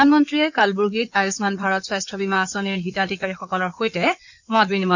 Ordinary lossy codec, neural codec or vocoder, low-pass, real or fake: none; codec, 16 kHz in and 24 kHz out, 2.2 kbps, FireRedTTS-2 codec; 7.2 kHz; fake